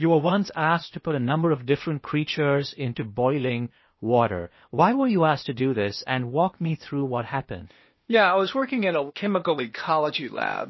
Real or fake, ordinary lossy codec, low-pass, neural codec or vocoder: fake; MP3, 24 kbps; 7.2 kHz; codec, 16 kHz, 0.8 kbps, ZipCodec